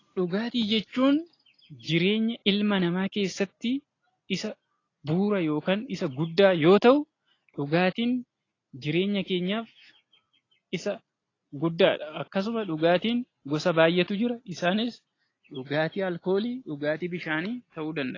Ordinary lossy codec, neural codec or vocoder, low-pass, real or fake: AAC, 32 kbps; none; 7.2 kHz; real